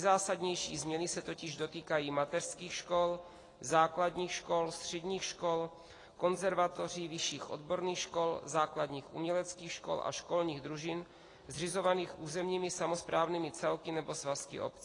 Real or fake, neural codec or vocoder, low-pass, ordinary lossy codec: real; none; 10.8 kHz; AAC, 32 kbps